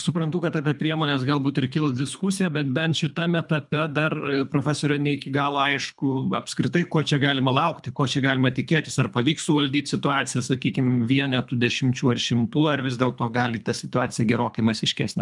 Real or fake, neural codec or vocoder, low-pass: fake; codec, 24 kHz, 3 kbps, HILCodec; 10.8 kHz